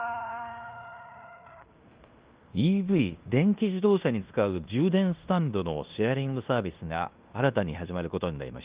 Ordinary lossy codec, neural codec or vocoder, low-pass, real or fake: Opus, 32 kbps; codec, 16 kHz in and 24 kHz out, 0.9 kbps, LongCat-Audio-Codec, fine tuned four codebook decoder; 3.6 kHz; fake